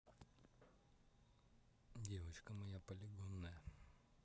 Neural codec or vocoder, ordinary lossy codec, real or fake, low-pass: none; none; real; none